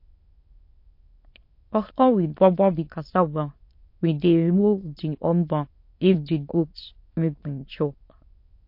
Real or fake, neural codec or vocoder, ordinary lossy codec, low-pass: fake; autoencoder, 22.05 kHz, a latent of 192 numbers a frame, VITS, trained on many speakers; MP3, 32 kbps; 5.4 kHz